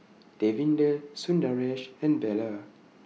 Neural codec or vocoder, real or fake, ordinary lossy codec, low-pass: none; real; none; none